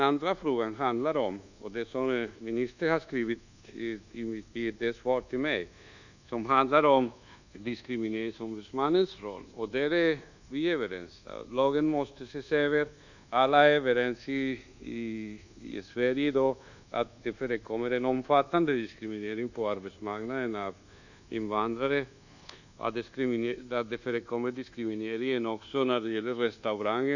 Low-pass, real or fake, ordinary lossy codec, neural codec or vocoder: 7.2 kHz; fake; none; codec, 24 kHz, 1.2 kbps, DualCodec